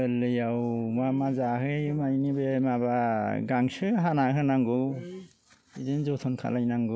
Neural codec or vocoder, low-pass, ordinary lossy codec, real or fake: none; none; none; real